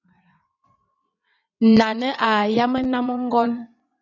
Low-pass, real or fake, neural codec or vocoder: 7.2 kHz; fake; vocoder, 22.05 kHz, 80 mel bands, WaveNeXt